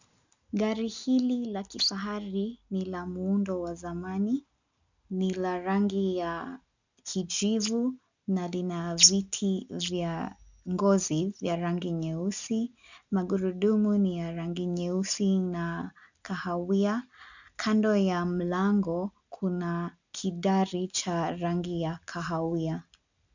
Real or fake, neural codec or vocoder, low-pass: real; none; 7.2 kHz